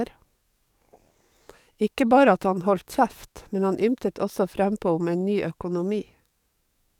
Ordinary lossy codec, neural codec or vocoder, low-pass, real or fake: none; codec, 44.1 kHz, 7.8 kbps, DAC; 19.8 kHz; fake